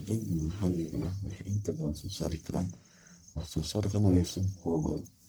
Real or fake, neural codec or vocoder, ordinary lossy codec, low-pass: fake; codec, 44.1 kHz, 1.7 kbps, Pupu-Codec; none; none